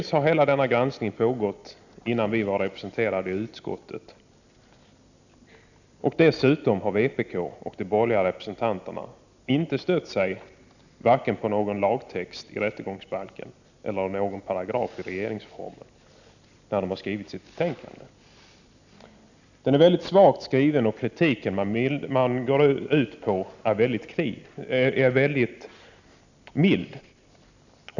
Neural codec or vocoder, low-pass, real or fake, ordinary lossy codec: none; 7.2 kHz; real; none